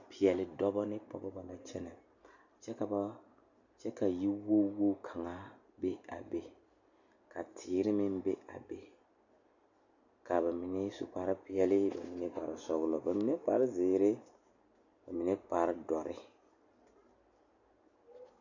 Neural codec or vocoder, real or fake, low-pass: none; real; 7.2 kHz